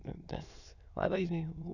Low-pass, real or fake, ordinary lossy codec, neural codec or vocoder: 7.2 kHz; fake; none; autoencoder, 22.05 kHz, a latent of 192 numbers a frame, VITS, trained on many speakers